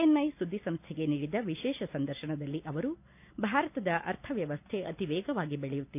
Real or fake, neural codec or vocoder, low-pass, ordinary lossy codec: real; none; 3.6 kHz; none